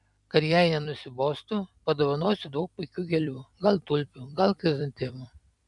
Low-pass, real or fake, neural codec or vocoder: 10.8 kHz; fake; vocoder, 44.1 kHz, 128 mel bands every 256 samples, BigVGAN v2